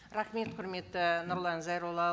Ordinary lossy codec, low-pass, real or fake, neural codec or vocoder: none; none; real; none